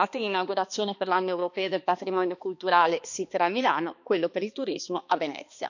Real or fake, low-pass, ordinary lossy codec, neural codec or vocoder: fake; 7.2 kHz; none; codec, 16 kHz, 2 kbps, X-Codec, HuBERT features, trained on balanced general audio